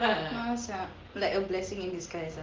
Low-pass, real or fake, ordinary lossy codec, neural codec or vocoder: 7.2 kHz; real; Opus, 16 kbps; none